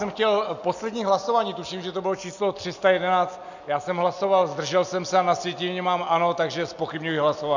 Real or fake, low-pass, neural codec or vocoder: real; 7.2 kHz; none